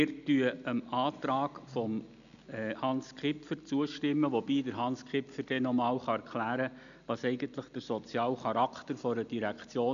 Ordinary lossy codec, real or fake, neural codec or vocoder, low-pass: none; real; none; 7.2 kHz